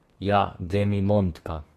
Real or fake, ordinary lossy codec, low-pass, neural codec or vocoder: fake; AAC, 48 kbps; 14.4 kHz; codec, 32 kHz, 1.9 kbps, SNAC